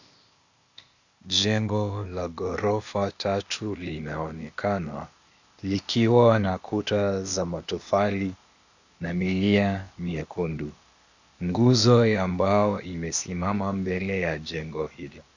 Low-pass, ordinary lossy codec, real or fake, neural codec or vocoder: 7.2 kHz; Opus, 64 kbps; fake; codec, 16 kHz, 0.8 kbps, ZipCodec